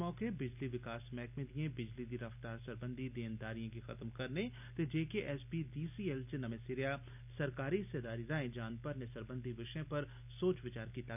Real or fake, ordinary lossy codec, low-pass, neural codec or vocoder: real; none; 3.6 kHz; none